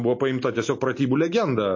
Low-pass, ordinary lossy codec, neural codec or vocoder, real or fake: 7.2 kHz; MP3, 32 kbps; none; real